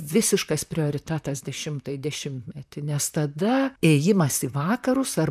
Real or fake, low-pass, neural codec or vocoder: fake; 14.4 kHz; vocoder, 44.1 kHz, 128 mel bands, Pupu-Vocoder